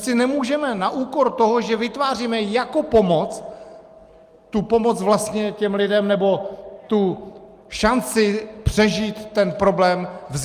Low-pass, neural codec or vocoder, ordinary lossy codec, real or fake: 14.4 kHz; none; Opus, 32 kbps; real